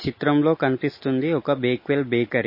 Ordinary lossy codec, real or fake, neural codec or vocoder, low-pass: MP3, 24 kbps; real; none; 5.4 kHz